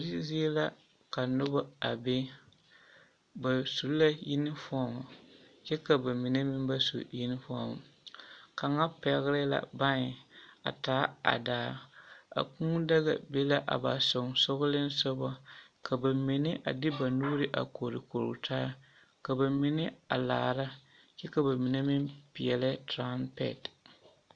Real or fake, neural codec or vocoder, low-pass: real; none; 9.9 kHz